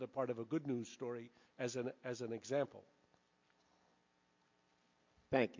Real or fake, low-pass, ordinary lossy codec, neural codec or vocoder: real; 7.2 kHz; MP3, 48 kbps; none